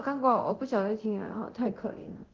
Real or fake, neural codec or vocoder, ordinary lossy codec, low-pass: fake; codec, 24 kHz, 0.9 kbps, DualCodec; Opus, 16 kbps; 7.2 kHz